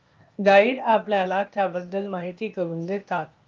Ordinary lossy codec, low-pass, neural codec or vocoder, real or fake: Opus, 24 kbps; 7.2 kHz; codec, 16 kHz, 0.8 kbps, ZipCodec; fake